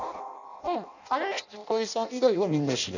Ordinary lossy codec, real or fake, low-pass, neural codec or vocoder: none; fake; 7.2 kHz; codec, 16 kHz in and 24 kHz out, 0.6 kbps, FireRedTTS-2 codec